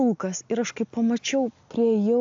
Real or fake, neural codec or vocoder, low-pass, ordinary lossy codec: real; none; 7.2 kHz; AAC, 64 kbps